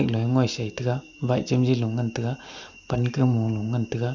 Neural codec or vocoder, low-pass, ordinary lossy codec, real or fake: none; 7.2 kHz; none; real